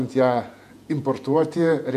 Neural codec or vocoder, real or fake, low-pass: vocoder, 48 kHz, 128 mel bands, Vocos; fake; 14.4 kHz